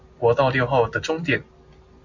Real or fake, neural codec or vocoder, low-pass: real; none; 7.2 kHz